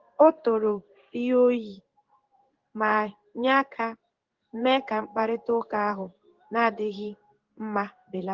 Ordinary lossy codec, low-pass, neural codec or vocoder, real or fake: Opus, 16 kbps; 7.2 kHz; codec, 16 kHz in and 24 kHz out, 1 kbps, XY-Tokenizer; fake